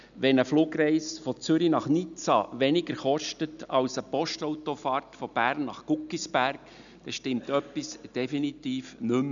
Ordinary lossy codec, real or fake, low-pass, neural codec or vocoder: none; real; 7.2 kHz; none